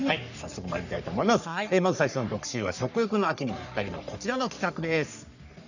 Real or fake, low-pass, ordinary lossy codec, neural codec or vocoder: fake; 7.2 kHz; none; codec, 44.1 kHz, 3.4 kbps, Pupu-Codec